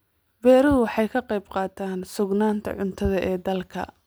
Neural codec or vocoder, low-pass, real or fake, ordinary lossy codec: none; none; real; none